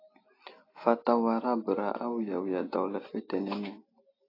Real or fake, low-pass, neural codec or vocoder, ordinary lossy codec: real; 5.4 kHz; none; AAC, 24 kbps